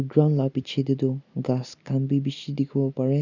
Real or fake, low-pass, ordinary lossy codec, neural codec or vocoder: real; 7.2 kHz; none; none